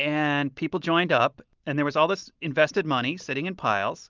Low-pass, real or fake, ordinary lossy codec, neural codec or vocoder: 7.2 kHz; real; Opus, 24 kbps; none